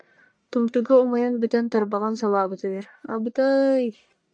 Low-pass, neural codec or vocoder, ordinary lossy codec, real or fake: 9.9 kHz; codec, 44.1 kHz, 1.7 kbps, Pupu-Codec; MP3, 96 kbps; fake